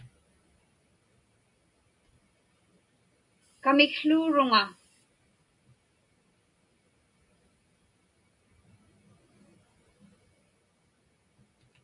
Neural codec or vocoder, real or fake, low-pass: none; real; 10.8 kHz